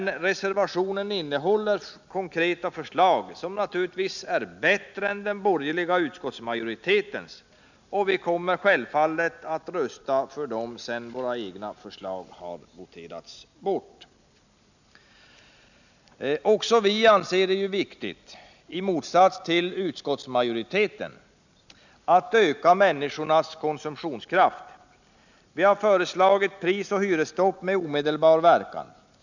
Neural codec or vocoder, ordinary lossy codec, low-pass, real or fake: none; none; 7.2 kHz; real